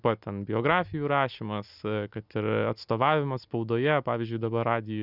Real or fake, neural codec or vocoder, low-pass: real; none; 5.4 kHz